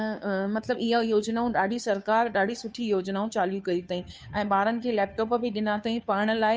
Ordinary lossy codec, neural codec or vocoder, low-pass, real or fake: none; codec, 16 kHz, 2 kbps, FunCodec, trained on Chinese and English, 25 frames a second; none; fake